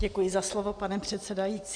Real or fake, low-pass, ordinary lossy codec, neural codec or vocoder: real; 9.9 kHz; MP3, 64 kbps; none